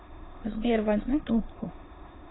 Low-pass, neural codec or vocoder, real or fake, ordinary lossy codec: 7.2 kHz; autoencoder, 22.05 kHz, a latent of 192 numbers a frame, VITS, trained on many speakers; fake; AAC, 16 kbps